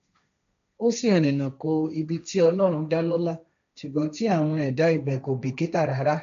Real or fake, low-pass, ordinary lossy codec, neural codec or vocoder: fake; 7.2 kHz; AAC, 96 kbps; codec, 16 kHz, 1.1 kbps, Voila-Tokenizer